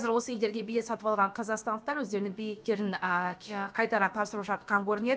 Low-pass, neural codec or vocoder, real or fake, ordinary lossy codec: none; codec, 16 kHz, about 1 kbps, DyCAST, with the encoder's durations; fake; none